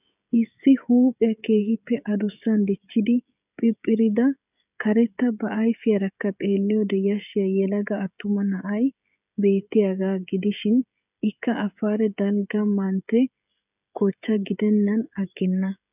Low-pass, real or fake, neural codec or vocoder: 3.6 kHz; fake; codec, 16 kHz, 16 kbps, FreqCodec, smaller model